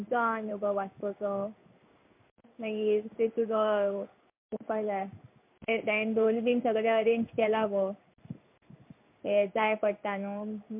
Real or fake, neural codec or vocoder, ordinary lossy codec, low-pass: fake; codec, 16 kHz in and 24 kHz out, 1 kbps, XY-Tokenizer; MP3, 32 kbps; 3.6 kHz